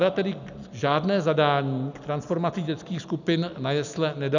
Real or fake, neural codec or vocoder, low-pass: real; none; 7.2 kHz